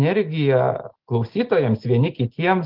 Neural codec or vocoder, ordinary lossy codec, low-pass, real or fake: none; Opus, 16 kbps; 5.4 kHz; real